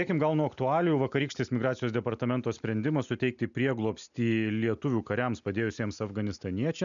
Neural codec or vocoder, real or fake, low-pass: none; real; 7.2 kHz